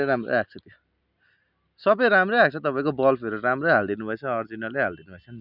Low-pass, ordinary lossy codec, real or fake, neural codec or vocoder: 5.4 kHz; none; real; none